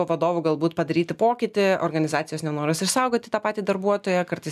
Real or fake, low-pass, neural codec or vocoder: real; 14.4 kHz; none